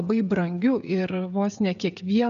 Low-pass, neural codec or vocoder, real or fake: 7.2 kHz; codec, 16 kHz, 16 kbps, FreqCodec, smaller model; fake